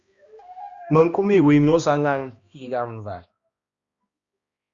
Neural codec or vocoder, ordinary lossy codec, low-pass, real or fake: codec, 16 kHz, 1 kbps, X-Codec, HuBERT features, trained on balanced general audio; Opus, 64 kbps; 7.2 kHz; fake